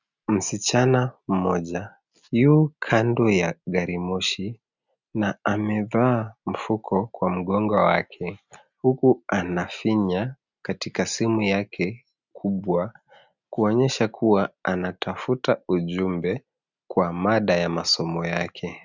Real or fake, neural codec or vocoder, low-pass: real; none; 7.2 kHz